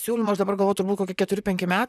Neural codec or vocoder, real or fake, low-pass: vocoder, 44.1 kHz, 128 mel bands, Pupu-Vocoder; fake; 14.4 kHz